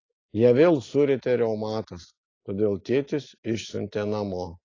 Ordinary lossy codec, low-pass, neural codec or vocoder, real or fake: AAC, 32 kbps; 7.2 kHz; none; real